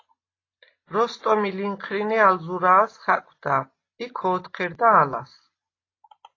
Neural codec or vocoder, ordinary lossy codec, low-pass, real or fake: none; AAC, 32 kbps; 7.2 kHz; real